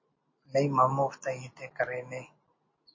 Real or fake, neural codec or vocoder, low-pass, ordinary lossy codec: real; none; 7.2 kHz; MP3, 32 kbps